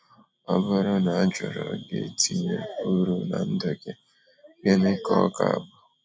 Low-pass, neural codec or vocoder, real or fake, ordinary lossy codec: none; none; real; none